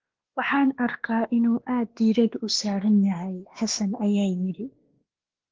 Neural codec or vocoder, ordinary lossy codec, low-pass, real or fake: codec, 16 kHz, 2 kbps, X-Codec, WavLM features, trained on Multilingual LibriSpeech; Opus, 16 kbps; 7.2 kHz; fake